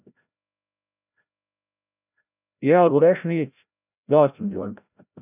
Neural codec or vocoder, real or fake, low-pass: codec, 16 kHz, 0.5 kbps, FreqCodec, larger model; fake; 3.6 kHz